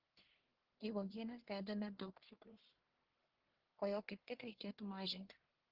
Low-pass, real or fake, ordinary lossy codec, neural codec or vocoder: 5.4 kHz; fake; Opus, 16 kbps; codec, 44.1 kHz, 1.7 kbps, Pupu-Codec